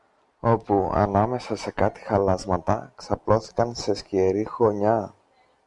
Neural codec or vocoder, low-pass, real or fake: none; 10.8 kHz; real